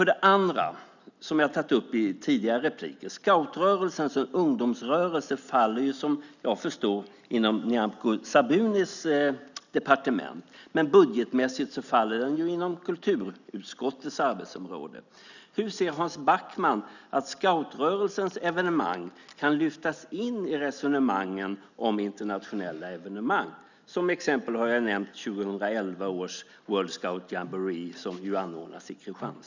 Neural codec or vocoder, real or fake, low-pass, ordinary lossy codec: none; real; 7.2 kHz; none